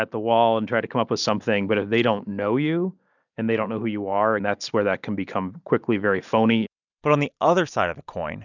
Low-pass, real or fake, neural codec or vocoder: 7.2 kHz; real; none